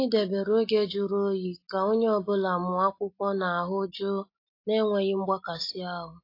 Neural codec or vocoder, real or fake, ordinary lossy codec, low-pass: none; real; AAC, 32 kbps; 5.4 kHz